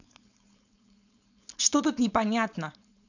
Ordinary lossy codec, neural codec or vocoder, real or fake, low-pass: none; codec, 16 kHz, 4.8 kbps, FACodec; fake; 7.2 kHz